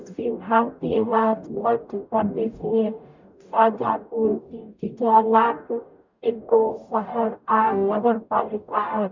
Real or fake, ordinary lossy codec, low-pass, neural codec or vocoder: fake; none; 7.2 kHz; codec, 44.1 kHz, 0.9 kbps, DAC